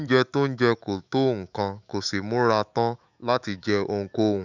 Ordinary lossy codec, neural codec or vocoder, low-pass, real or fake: none; autoencoder, 48 kHz, 128 numbers a frame, DAC-VAE, trained on Japanese speech; 7.2 kHz; fake